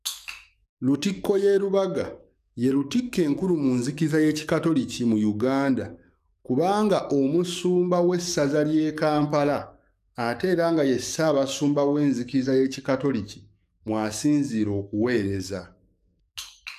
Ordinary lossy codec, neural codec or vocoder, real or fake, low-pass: none; codec, 44.1 kHz, 7.8 kbps, DAC; fake; 14.4 kHz